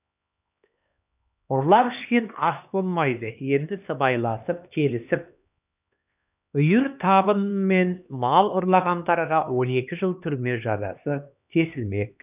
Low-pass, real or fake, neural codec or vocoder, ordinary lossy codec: 3.6 kHz; fake; codec, 16 kHz, 2 kbps, X-Codec, HuBERT features, trained on LibriSpeech; none